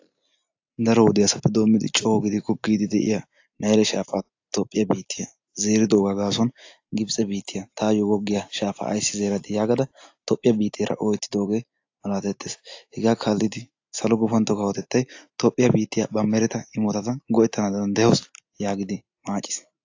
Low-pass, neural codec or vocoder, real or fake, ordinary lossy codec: 7.2 kHz; none; real; AAC, 48 kbps